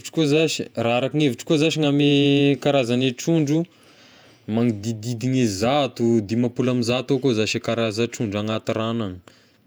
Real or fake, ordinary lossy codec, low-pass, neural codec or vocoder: fake; none; none; vocoder, 48 kHz, 128 mel bands, Vocos